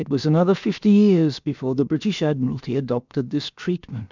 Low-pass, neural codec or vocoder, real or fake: 7.2 kHz; codec, 16 kHz, about 1 kbps, DyCAST, with the encoder's durations; fake